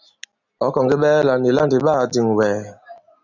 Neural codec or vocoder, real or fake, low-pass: none; real; 7.2 kHz